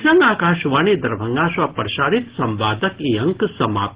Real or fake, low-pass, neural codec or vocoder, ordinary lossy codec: real; 3.6 kHz; none; Opus, 16 kbps